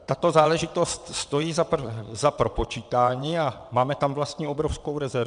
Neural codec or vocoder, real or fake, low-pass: vocoder, 22.05 kHz, 80 mel bands, WaveNeXt; fake; 9.9 kHz